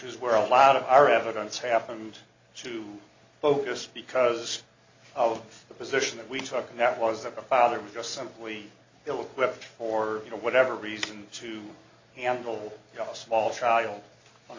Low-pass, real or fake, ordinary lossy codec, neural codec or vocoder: 7.2 kHz; real; MP3, 64 kbps; none